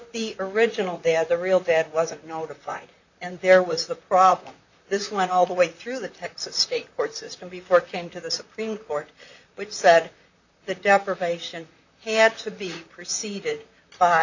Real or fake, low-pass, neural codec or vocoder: fake; 7.2 kHz; vocoder, 44.1 kHz, 128 mel bands, Pupu-Vocoder